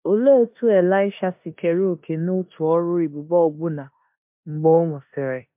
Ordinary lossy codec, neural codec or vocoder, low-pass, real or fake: MP3, 32 kbps; codec, 16 kHz in and 24 kHz out, 0.9 kbps, LongCat-Audio-Codec, four codebook decoder; 3.6 kHz; fake